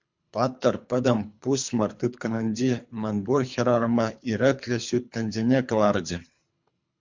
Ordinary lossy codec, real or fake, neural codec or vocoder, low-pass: MP3, 64 kbps; fake; codec, 24 kHz, 3 kbps, HILCodec; 7.2 kHz